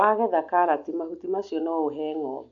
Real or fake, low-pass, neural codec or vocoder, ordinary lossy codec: real; 7.2 kHz; none; AAC, 48 kbps